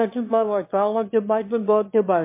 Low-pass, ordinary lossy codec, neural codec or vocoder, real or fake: 3.6 kHz; MP3, 24 kbps; autoencoder, 22.05 kHz, a latent of 192 numbers a frame, VITS, trained on one speaker; fake